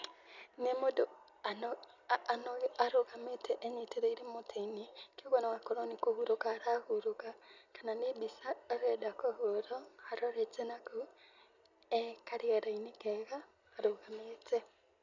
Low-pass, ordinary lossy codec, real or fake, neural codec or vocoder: 7.2 kHz; none; real; none